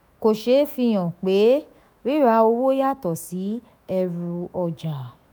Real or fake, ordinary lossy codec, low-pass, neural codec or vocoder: fake; none; none; autoencoder, 48 kHz, 128 numbers a frame, DAC-VAE, trained on Japanese speech